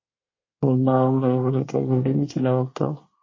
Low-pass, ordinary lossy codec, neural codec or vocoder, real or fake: 7.2 kHz; MP3, 32 kbps; codec, 24 kHz, 1 kbps, SNAC; fake